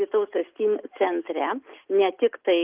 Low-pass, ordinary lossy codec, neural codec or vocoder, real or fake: 3.6 kHz; Opus, 24 kbps; none; real